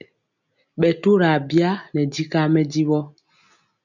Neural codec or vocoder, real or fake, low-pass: none; real; 7.2 kHz